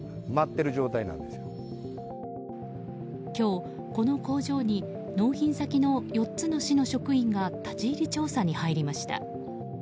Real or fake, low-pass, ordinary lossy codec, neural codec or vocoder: real; none; none; none